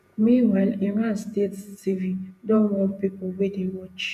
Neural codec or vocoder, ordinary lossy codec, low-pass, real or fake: vocoder, 48 kHz, 128 mel bands, Vocos; none; 14.4 kHz; fake